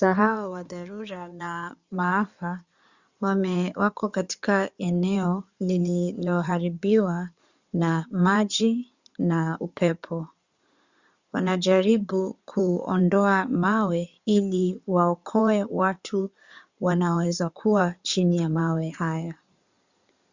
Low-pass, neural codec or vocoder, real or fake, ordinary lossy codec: 7.2 kHz; codec, 16 kHz in and 24 kHz out, 2.2 kbps, FireRedTTS-2 codec; fake; Opus, 64 kbps